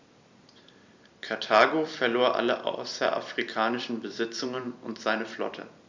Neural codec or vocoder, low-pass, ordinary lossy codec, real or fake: vocoder, 44.1 kHz, 128 mel bands every 256 samples, BigVGAN v2; 7.2 kHz; MP3, 64 kbps; fake